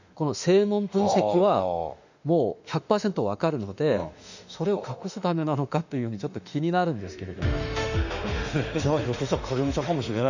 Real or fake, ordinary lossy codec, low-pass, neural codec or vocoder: fake; none; 7.2 kHz; autoencoder, 48 kHz, 32 numbers a frame, DAC-VAE, trained on Japanese speech